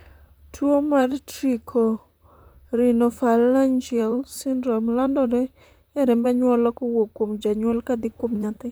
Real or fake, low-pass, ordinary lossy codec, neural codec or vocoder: fake; none; none; vocoder, 44.1 kHz, 128 mel bands, Pupu-Vocoder